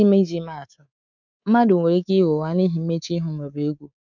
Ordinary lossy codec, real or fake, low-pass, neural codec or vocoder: none; fake; 7.2 kHz; codec, 24 kHz, 3.1 kbps, DualCodec